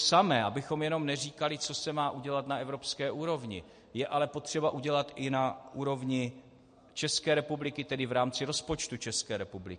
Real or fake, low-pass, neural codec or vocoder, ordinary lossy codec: real; 9.9 kHz; none; MP3, 48 kbps